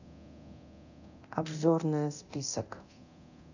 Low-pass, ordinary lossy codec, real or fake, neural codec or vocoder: 7.2 kHz; none; fake; codec, 24 kHz, 0.9 kbps, DualCodec